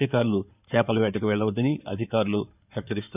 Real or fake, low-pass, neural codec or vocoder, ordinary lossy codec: fake; 3.6 kHz; codec, 16 kHz in and 24 kHz out, 2.2 kbps, FireRedTTS-2 codec; none